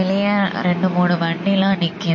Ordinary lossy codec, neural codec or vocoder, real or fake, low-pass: MP3, 32 kbps; none; real; 7.2 kHz